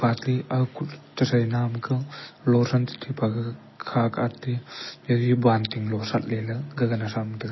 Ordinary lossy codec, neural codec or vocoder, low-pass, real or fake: MP3, 24 kbps; none; 7.2 kHz; real